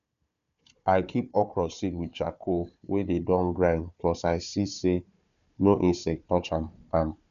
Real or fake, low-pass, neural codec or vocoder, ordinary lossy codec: fake; 7.2 kHz; codec, 16 kHz, 4 kbps, FunCodec, trained on Chinese and English, 50 frames a second; none